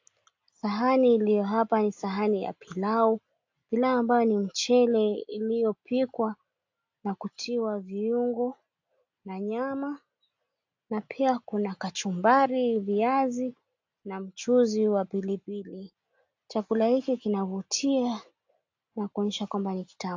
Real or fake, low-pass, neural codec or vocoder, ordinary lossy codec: real; 7.2 kHz; none; AAC, 48 kbps